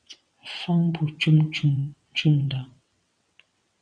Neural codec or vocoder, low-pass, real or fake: vocoder, 22.05 kHz, 80 mel bands, WaveNeXt; 9.9 kHz; fake